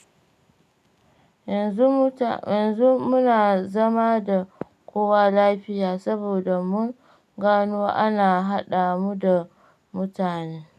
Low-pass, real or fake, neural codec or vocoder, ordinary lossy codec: 14.4 kHz; real; none; none